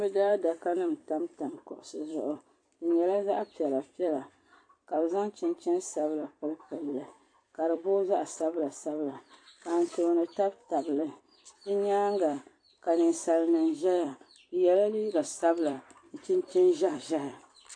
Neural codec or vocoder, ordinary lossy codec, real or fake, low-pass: vocoder, 44.1 kHz, 128 mel bands every 256 samples, BigVGAN v2; AAC, 48 kbps; fake; 9.9 kHz